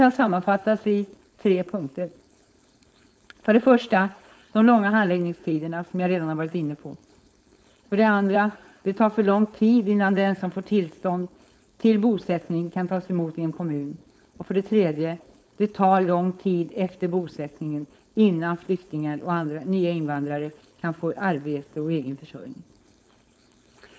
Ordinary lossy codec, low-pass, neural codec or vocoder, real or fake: none; none; codec, 16 kHz, 4.8 kbps, FACodec; fake